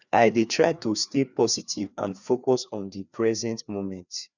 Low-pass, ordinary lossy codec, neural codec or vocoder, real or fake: 7.2 kHz; none; codec, 16 kHz, 2 kbps, FreqCodec, larger model; fake